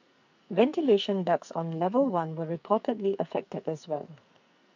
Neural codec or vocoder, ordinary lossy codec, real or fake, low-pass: codec, 44.1 kHz, 2.6 kbps, SNAC; none; fake; 7.2 kHz